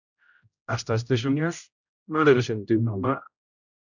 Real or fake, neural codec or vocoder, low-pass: fake; codec, 16 kHz, 0.5 kbps, X-Codec, HuBERT features, trained on general audio; 7.2 kHz